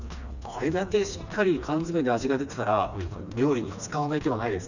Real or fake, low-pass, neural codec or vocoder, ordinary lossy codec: fake; 7.2 kHz; codec, 16 kHz, 2 kbps, FreqCodec, smaller model; none